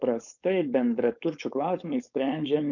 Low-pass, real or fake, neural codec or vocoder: 7.2 kHz; fake; codec, 16 kHz, 4.8 kbps, FACodec